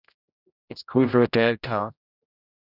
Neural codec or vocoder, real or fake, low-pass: codec, 16 kHz, 0.5 kbps, X-Codec, HuBERT features, trained on general audio; fake; 5.4 kHz